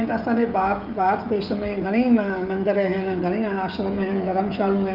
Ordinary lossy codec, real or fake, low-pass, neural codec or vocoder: Opus, 24 kbps; fake; 5.4 kHz; codec, 16 kHz, 16 kbps, FreqCodec, smaller model